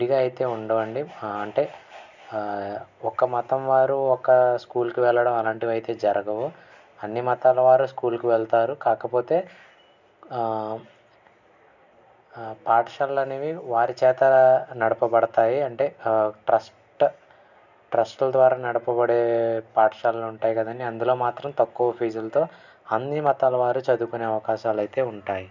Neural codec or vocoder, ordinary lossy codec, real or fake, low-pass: none; none; real; 7.2 kHz